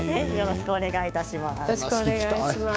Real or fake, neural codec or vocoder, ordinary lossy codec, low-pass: fake; codec, 16 kHz, 6 kbps, DAC; none; none